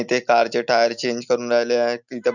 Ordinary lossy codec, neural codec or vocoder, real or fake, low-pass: none; none; real; 7.2 kHz